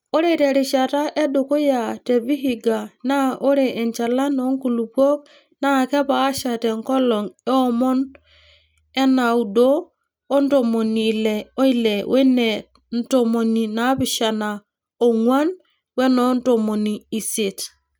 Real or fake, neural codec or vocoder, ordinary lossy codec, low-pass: real; none; none; none